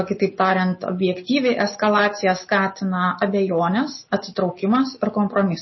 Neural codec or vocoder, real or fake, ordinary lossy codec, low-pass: none; real; MP3, 24 kbps; 7.2 kHz